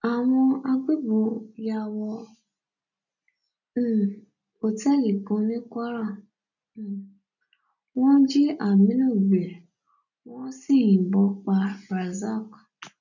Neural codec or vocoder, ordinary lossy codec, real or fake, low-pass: none; none; real; 7.2 kHz